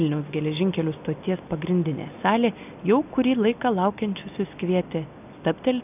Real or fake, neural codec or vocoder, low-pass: real; none; 3.6 kHz